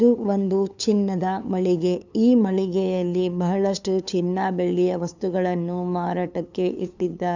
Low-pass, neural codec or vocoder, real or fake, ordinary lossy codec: 7.2 kHz; codec, 16 kHz, 2 kbps, FunCodec, trained on LibriTTS, 25 frames a second; fake; none